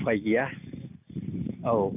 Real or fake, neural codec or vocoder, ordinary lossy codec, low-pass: real; none; none; 3.6 kHz